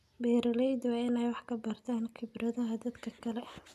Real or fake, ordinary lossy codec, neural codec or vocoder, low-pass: real; none; none; 14.4 kHz